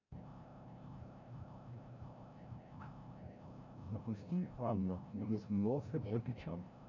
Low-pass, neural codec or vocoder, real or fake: 7.2 kHz; codec, 16 kHz, 0.5 kbps, FreqCodec, larger model; fake